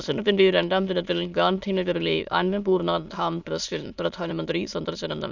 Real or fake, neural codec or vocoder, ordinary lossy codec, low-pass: fake; autoencoder, 22.05 kHz, a latent of 192 numbers a frame, VITS, trained on many speakers; none; 7.2 kHz